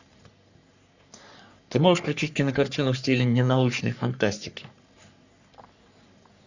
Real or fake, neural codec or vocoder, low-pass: fake; codec, 44.1 kHz, 3.4 kbps, Pupu-Codec; 7.2 kHz